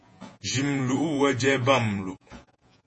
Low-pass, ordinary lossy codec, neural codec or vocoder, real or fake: 10.8 kHz; MP3, 32 kbps; vocoder, 48 kHz, 128 mel bands, Vocos; fake